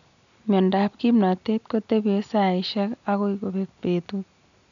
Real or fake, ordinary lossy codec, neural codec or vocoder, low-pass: real; none; none; 7.2 kHz